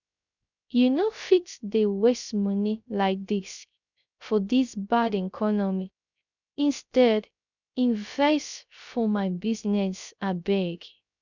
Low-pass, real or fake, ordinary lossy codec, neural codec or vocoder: 7.2 kHz; fake; none; codec, 16 kHz, 0.3 kbps, FocalCodec